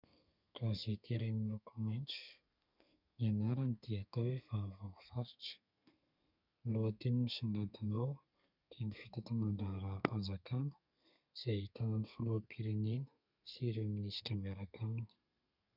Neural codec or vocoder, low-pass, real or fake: codec, 32 kHz, 1.9 kbps, SNAC; 5.4 kHz; fake